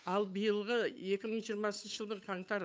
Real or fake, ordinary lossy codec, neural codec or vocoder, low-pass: fake; none; codec, 16 kHz, 8 kbps, FunCodec, trained on Chinese and English, 25 frames a second; none